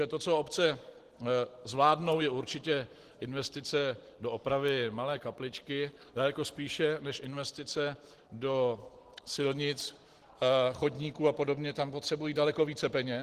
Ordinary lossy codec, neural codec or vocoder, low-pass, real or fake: Opus, 16 kbps; none; 14.4 kHz; real